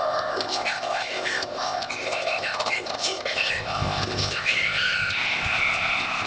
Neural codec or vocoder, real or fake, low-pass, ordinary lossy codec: codec, 16 kHz, 0.8 kbps, ZipCodec; fake; none; none